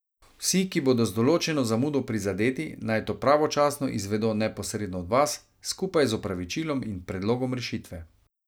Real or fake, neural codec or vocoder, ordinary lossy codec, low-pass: real; none; none; none